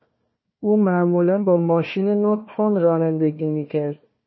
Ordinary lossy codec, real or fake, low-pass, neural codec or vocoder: MP3, 24 kbps; fake; 7.2 kHz; codec, 16 kHz, 1 kbps, FunCodec, trained on Chinese and English, 50 frames a second